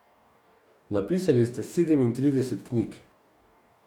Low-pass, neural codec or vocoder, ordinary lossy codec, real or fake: 19.8 kHz; codec, 44.1 kHz, 2.6 kbps, DAC; none; fake